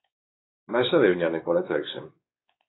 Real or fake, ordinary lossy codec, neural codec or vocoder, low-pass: fake; AAC, 16 kbps; codec, 16 kHz, 4 kbps, X-Codec, WavLM features, trained on Multilingual LibriSpeech; 7.2 kHz